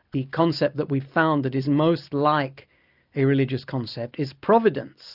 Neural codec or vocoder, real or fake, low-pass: none; real; 5.4 kHz